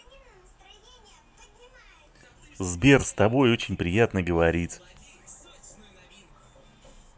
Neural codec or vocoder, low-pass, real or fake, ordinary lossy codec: none; none; real; none